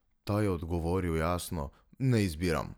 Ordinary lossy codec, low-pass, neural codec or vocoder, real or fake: none; none; none; real